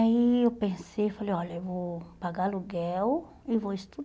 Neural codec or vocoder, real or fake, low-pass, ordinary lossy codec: none; real; none; none